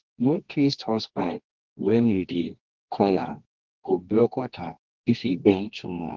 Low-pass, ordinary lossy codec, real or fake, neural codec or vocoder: 7.2 kHz; Opus, 16 kbps; fake; codec, 24 kHz, 0.9 kbps, WavTokenizer, medium music audio release